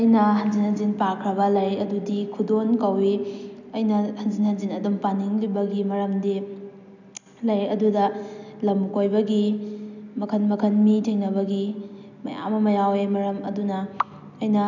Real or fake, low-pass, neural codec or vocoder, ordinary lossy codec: real; 7.2 kHz; none; none